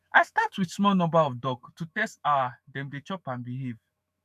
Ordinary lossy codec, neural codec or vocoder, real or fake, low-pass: none; autoencoder, 48 kHz, 128 numbers a frame, DAC-VAE, trained on Japanese speech; fake; 14.4 kHz